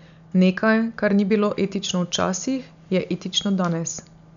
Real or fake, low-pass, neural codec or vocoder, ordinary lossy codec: real; 7.2 kHz; none; none